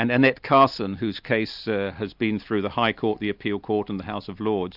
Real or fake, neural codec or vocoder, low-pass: real; none; 5.4 kHz